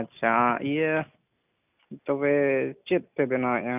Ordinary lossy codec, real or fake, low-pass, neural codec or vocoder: none; real; 3.6 kHz; none